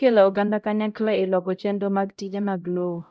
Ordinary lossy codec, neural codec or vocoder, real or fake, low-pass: none; codec, 16 kHz, 0.5 kbps, X-Codec, HuBERT features, trained on LibriSpeech; fake; none